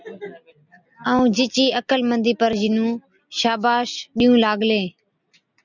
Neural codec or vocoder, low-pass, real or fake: none; 7.2 kHz; real